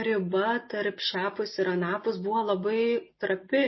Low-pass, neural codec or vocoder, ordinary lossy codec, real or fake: 7.2 kHz; none; MP3, 24 kbps; real